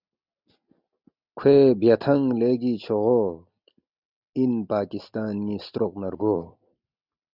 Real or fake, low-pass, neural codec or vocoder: real; 5.4 kHz; none